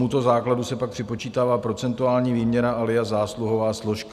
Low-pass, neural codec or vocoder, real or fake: 14.4 kHz; none; real